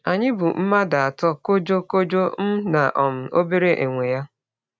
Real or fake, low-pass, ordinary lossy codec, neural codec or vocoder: real; none; none; none